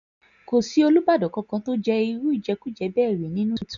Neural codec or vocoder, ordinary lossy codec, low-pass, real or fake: none; none; 7.2 kHz; real